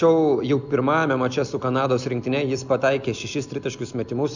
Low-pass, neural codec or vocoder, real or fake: 7.2 kHz; none; real